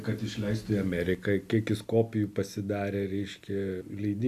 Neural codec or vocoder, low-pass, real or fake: none; 14.4 kHz; real